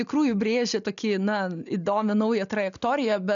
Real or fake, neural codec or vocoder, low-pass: real; none; 7.2 kHz